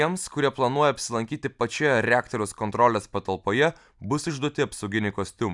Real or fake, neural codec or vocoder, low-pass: real; none; 10.8 kHz